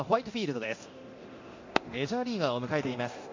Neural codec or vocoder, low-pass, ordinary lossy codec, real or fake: codec, 24 kHz, 0.9 kbps, DualCodec; 7.2 kHz; MP3, 48 kbps; fake